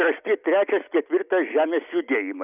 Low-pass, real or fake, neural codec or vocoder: 3.6 kHz; real; none